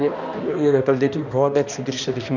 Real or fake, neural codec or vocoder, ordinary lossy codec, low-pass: fake; codec, 16 kHz, 1 kbps, X-Codec, HuBERT features, trained on balanced general audio; none; 7.2 kHz